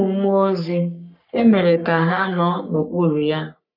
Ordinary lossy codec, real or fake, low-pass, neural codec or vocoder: none; fake; 5.4 kHz; codec, 44.1 kHz, 3.4 kbps, Pupu-Codec